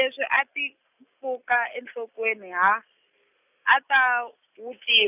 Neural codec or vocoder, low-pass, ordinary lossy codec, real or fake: none; 3.6 kHz; none; real